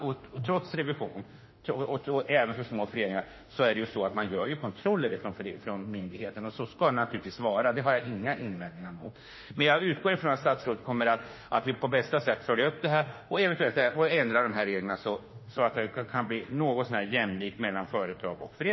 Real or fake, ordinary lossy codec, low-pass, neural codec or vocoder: fake; MP3, 24 kbps; 7.2 kHz; autoencoder, 48 kHz, 32 numbers a frame, DAC-VAE, trained on Japanese speech